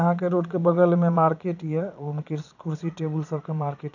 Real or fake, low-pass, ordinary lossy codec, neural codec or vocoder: fake; 7.2 kHz; none; vocoder, 22.05 kHz, 80 mel bands, Vocos